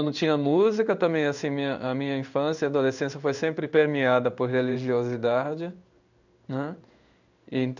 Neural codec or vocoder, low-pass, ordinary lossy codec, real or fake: codec, 16 kHz in and 24 kHz out, 1 kbps, XY-Tokenizer; 7.2 kHz; none; fake